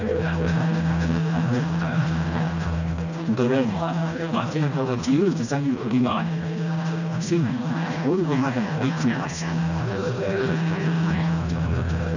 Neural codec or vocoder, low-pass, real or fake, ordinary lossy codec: codec, 16 kHz, 1 kbps, FreqCodec, smaller model; 7.2 kHz; fake; none